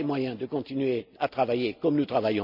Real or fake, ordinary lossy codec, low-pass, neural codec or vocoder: real; none; 5.4 kHz; none